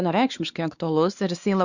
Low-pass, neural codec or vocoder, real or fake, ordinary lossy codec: 7.2 kHz; codec, 16 kHz, 2 kbps, X-Codec, WavLM features, trained on Multilingual LibriSpeech; fake; Opus, 64 kbps